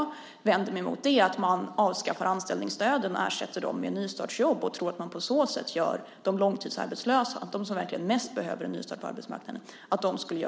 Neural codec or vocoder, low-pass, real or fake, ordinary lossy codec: none; none; real; none